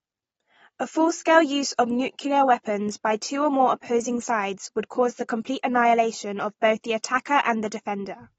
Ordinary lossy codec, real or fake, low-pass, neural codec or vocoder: AAC, 24 kbps; real; 19.8 kHz; none